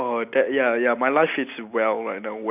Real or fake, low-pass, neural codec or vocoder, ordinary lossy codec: real; 3.6 kHz; none; none